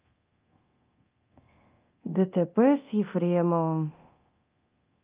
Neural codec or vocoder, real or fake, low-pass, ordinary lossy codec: codec, 24 kHz, 0.9 kbps, DualCodec; fake; 3.6 kHz; Opus, 32 kbps